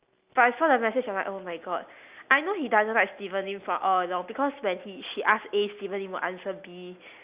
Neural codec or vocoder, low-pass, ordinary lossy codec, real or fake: none; 3.6 kHz; Opus, 64 kbps; real